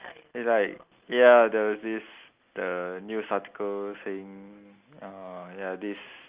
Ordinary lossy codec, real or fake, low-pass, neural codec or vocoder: Opus, 32 kbps; real; 3.6 kHz; none